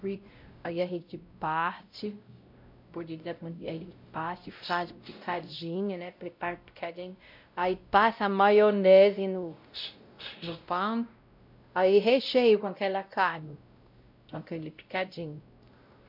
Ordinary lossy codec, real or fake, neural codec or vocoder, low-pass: MP3, 48 kbps; fake; codec, 16 kHz, 0.5 kbps, X-Codec, WavLM features, trained on Multilingual LibriSpeech; 5.4 kHz